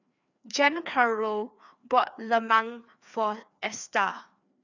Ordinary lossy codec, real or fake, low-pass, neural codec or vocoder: none; fake; 7.2 kHz; codec, 16 kHz, 2 kbps, FreqCodec, larger model